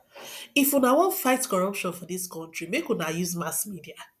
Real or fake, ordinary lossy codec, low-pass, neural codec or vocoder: real; none; 14.4 kHz; none